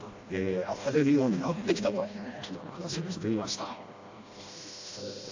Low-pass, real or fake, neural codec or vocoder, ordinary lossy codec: 7.2 kHz; fake; codec, 16 kHz, 1 kbps, FreqCodec, smaller model; AAC, 48 kbps